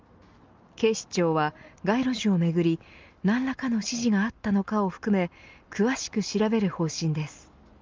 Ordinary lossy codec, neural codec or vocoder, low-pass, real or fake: Opus, 24 kbps; none; 7.2 kHz; real